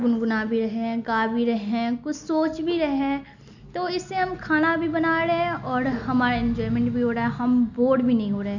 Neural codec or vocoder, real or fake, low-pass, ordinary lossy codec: none; real; 7.2 kHz; none